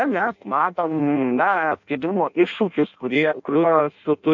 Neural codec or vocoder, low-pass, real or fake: codec, 16 kHz in and 24 kHz out, 0.6 kbps, FireRedTTS-2 codec; 7.2 kHz; fake